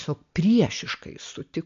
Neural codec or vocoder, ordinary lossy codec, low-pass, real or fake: none; MP3, 48 kbps; 7.2 kHz; real